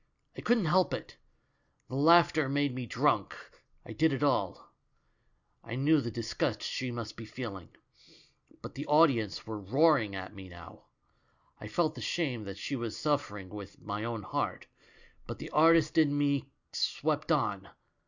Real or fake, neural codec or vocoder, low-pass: real; none; 7.2 kHz